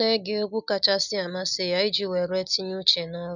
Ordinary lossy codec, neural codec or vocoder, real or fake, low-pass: none; none; real; 7.2 kHz